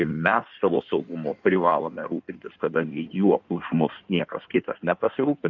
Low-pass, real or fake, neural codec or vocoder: 7.2 kHz; fake; codec, 16 kHz in and 24 kHz out, 1.1 kbps, FireRedTTS-2 codec